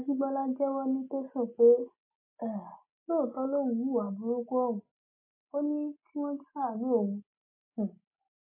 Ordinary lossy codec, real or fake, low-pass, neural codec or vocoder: MP3, 24 kbps; real; 3.6 kHz; none